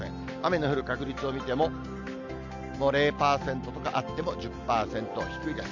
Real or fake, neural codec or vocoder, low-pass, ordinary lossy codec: real; none; 7.2 kHz; none